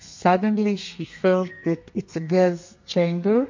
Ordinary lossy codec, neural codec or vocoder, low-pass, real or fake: MP3, 48 kbps; codec, 32 kHz, 1.9 kbps, SNAC; 7.2 kHz; fake